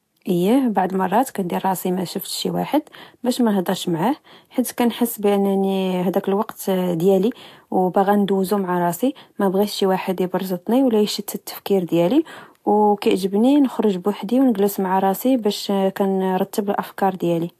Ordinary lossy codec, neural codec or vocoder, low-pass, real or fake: AAC, 64 kbps; none; 14.4 kHz; real